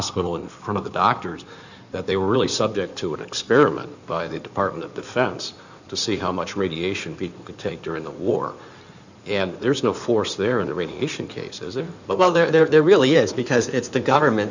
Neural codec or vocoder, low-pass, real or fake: codec, 16 kHz in and 24 kHz out, 2.2 kbps, FireRedTTS-2 codec; 7.2 kHz; fake